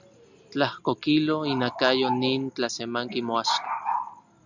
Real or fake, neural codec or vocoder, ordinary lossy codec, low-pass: real; none; Opus, 64 kbps; 7.2 kHz